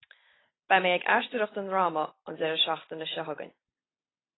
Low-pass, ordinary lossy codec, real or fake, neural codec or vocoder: 7.2 kHz; AAC, 16 kbps; real; none